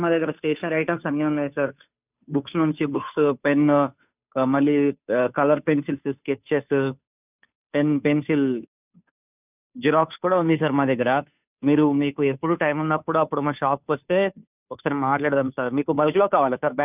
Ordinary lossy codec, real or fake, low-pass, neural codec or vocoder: none; fake; 3.6 kHz; codec, 16 kHz, 2 kbps, FunCodec, trained on Chinese and English, 25 frames a second